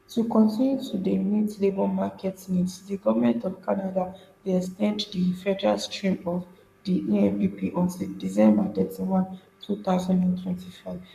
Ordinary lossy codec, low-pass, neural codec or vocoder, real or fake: none; 14.4 kHz; codec, 44.1 kHz, 7.8 kbps, Pupu-Codec; fake